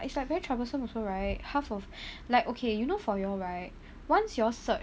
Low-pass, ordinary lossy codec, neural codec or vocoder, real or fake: none; none; none; real